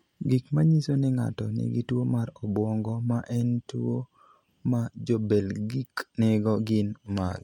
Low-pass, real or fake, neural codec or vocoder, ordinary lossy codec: 19.8 kHz; real; none; MP3, 64 kbps